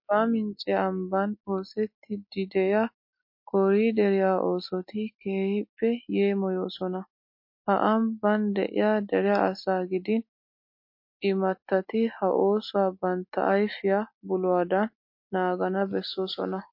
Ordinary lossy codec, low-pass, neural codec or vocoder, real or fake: MP3, 32 kbps; 5.4 kHz; none; real